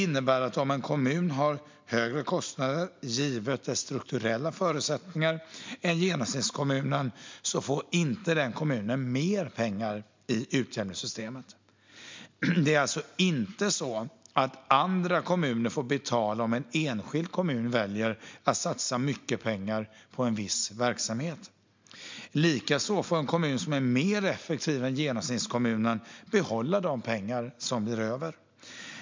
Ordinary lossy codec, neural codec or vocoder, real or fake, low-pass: MP3, 64 kbps; none; real; 7.2 kHz